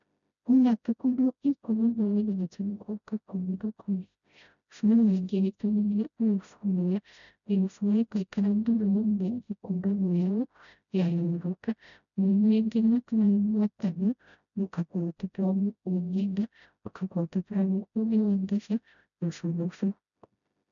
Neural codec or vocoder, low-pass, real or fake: codec, 16 kHz, 0.5 kbps, FreqCodec, smaller model; 7.2 kHz; fake